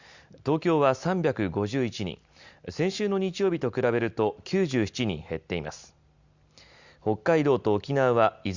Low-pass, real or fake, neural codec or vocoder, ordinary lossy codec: 7.2 kHz; real; none; Opus, 64 kbps